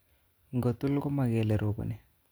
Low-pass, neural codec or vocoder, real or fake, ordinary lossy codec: none; none; real; none